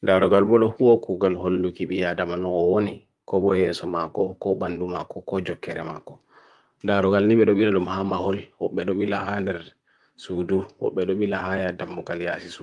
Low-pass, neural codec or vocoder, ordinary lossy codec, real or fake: 10.8 kHz; vocoder, 44.1 kHz, 128 mel bands, Pupu-Vocoder; Opus, 32 kbps; fake